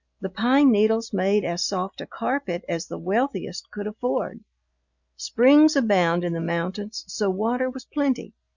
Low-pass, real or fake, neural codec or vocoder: 7.2 kHz; real; none